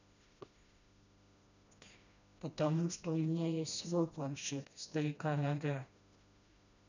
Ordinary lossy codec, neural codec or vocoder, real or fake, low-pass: none; codec, 16 kHz, 1 kbps, FreqCodec, smaller model; fake; 7.2 kHz